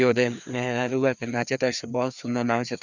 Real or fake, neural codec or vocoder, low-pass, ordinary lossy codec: fake; codec, 16 kHz, 2 kbps, FreqCodec, larger model; 7.2 kHz; none